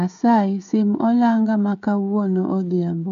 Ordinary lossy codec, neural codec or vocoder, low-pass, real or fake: none; codec, 16 kHz, 16 kbps, FreqCodec, smaller model; 7.2 kHz; fake